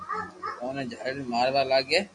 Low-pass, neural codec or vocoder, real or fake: 10.8 kHz; none; real